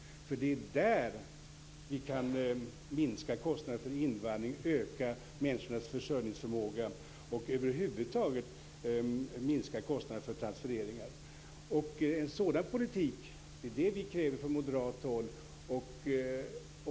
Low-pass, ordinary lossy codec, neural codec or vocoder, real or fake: none; none; none; real